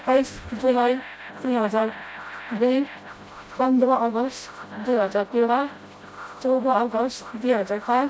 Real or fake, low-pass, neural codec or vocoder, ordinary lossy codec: fake; none; codec, 16 kHz, 0.5 kbps, FreqCodec, smaller model; none